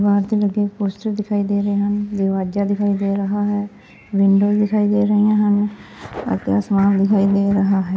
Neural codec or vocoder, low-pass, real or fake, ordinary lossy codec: none; none; real; none